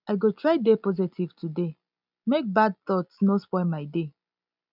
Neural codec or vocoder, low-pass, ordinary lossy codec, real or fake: none; 5.4 kHz; none; real